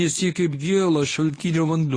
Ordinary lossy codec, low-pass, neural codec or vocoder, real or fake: AAC, 32 kbps; 9.9 kHz; codec, 24 kHz, 0.9 kbps, WavTokenizer, medium speech release version 1; fake